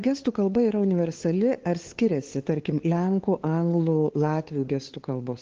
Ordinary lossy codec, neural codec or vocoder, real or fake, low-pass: Opus, 16 kbps; codec, 16 kHz, 2 kbps, FunCodec, trained on LibriTTS, 25 frames a second; fake; 7.2 kHz